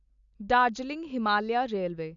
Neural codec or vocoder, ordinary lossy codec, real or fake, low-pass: none; none; real; 7.2 kHz